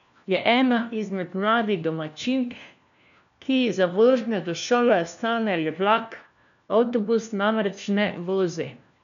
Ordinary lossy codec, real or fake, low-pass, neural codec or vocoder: none; fake; 7.2 kHz; codec, 16 kHz, 1 kbps, FunCodec, trained on LibriTTS, 50 frames a second